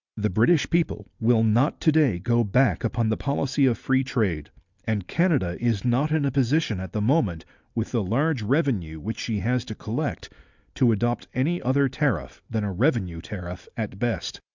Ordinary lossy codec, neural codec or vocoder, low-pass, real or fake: Opus, 64 kbps; none; 7.2 kHz; real